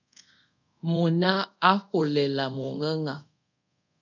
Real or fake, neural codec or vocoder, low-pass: fake; codec, 24 kHz, 0.5 kbps, DualCodec; 7.2 kHz